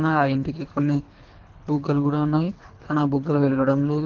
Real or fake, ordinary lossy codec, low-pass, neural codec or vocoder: fake; Opus, 16 kbps; 7.2 kHz; codec, 24 kHz, 3 kbps, HILCodec